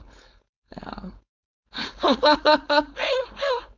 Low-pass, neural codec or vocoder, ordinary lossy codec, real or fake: 7.2 kHz; codec, 16 kHz, 4.8 kbps, FACodec; none; fake